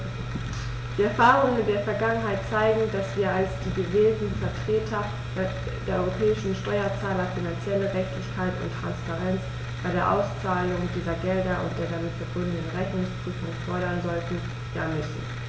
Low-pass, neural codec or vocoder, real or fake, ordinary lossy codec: none; none; real; none